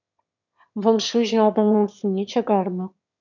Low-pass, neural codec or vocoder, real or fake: 7.2 kHz; autoencoder, 22.05 kHz, a latent of 192 numbers a frame, VITS, trained on one speaker; fake